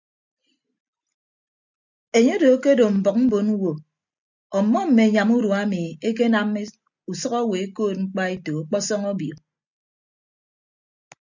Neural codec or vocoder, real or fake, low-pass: none; real; 7.2 kHz